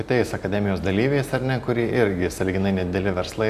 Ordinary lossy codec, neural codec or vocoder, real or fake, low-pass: Opus, 64 kbps; none; real; 14.4 kHz